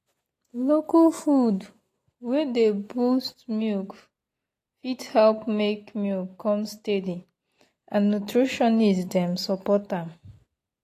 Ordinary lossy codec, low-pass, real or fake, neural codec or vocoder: AAC, 48 kbps; 14.4 kHz; real; none